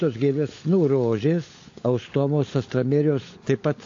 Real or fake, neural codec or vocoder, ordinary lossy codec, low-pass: fake; codec, 16 kHz, 16 kbps, FunCodec, trained on LibriTTS, 50 frames a second; AAC, 48 kbps; 7.2 kHz